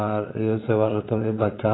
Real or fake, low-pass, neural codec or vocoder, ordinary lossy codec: fake; 7.2 kHz; vocoder, 22.05 kHz, 80 mel bands, Vocos; AAC, 16 kbps